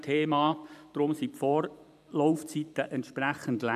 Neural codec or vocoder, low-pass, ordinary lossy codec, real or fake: vocoder, 44.1 kHz, 128 mel bands every 512 samples, BigVGAN v2; 14.4 kHz; none; fake